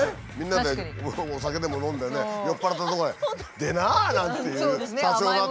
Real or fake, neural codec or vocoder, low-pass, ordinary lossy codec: real; none; none; none